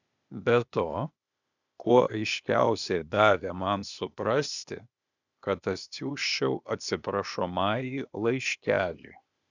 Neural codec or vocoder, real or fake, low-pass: codec, 16 kHz, 0.8 kbps, ZipCodec; fake; 7.2 kHz